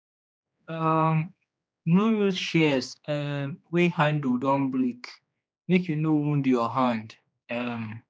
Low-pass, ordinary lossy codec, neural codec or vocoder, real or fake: none; none; codec, 16 kHz, 2 kbps, X-Codec, HuBERT features, trained on general audio; fake